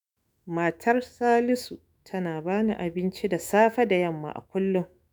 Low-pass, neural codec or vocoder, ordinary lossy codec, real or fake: none; autoencoder, 48 kHz, 128 numbers a frame, DAC-VAE, trained on Japanese speech; none; fake